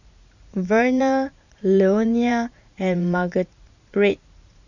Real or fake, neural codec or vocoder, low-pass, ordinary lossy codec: fake; vocoder, 44.1 kHz, 80 mel bands, Vocos; 7.2 kHz; none